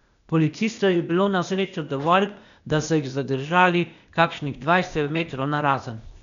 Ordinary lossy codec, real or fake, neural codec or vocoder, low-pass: none; fake; codec, 16 kHz, 0.8 kbps, ZipCodec; 7.2 kHz